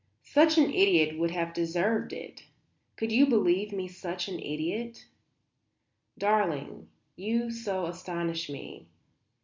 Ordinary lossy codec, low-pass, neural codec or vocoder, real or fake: MP3, 64 kbps; 7.2 kHz; none; real